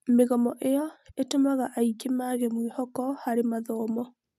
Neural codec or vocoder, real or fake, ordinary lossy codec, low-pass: none; real; none; 14.4 kHz